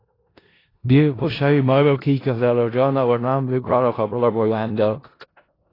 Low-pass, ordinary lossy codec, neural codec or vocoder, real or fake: 5.4 kHz; AAC, 24 kbps; codec, 16 kHz in and 24 kHz out, 0.4 kbps, LongCat-Audio-Codec, four codebook decoder; fake